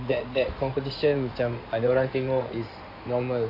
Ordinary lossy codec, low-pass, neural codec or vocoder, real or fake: AAC, 32 kbps; 5.4 kHz; codec, 16 kHz in and 24 kHz out, 2.2 kbps, FireRedTTS-2 codec; fake